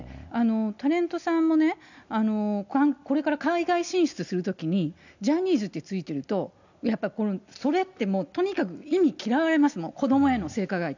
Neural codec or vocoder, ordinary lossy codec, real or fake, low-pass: none; none; real; 7.2 kHz